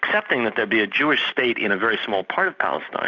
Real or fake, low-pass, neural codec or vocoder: real; 7.2 kHz; none